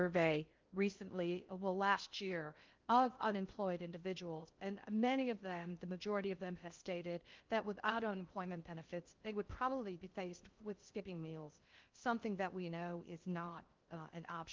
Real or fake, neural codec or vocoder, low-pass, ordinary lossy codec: fake; codec, 16 kHz in and 24 kHz out, 0.6 kbps, FocalCodec, streaming, 4096 codes; 7.2 kHz; Opus, 24 kbps